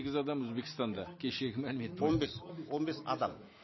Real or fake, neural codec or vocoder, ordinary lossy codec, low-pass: real; none; MP3, 24 kbps; 7.2 kHz